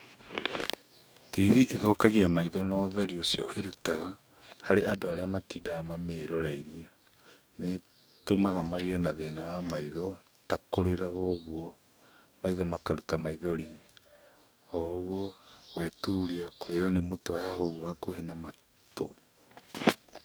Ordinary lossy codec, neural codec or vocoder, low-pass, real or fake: none; codec, 44.1 kHz, 2.6 kbps, DAC; none; fake